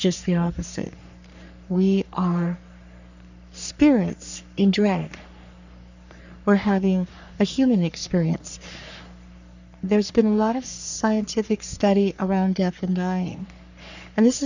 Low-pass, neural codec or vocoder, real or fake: 7.2 kHz; codec, 44.1 kHz, 3.4 kbps, Pupu-Codec; fake